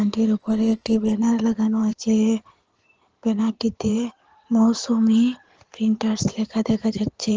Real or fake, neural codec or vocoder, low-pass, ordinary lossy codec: fake; codec, 16 kHz in and 24 kHz out, 2.2 kbps, FireRedTTS-2 codec; 7.2 kHz; Opus, 16 kbps